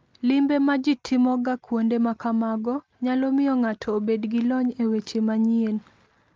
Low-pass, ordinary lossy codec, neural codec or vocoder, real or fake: 7.2 kHz; Opus, 32 kbps; none; real